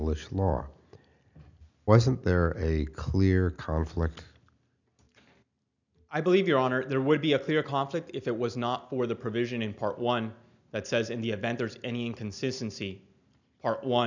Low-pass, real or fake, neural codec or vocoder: 7.2 kHz; real; none